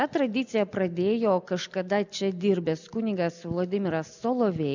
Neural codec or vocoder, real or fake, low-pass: none; real; 7.2 kHz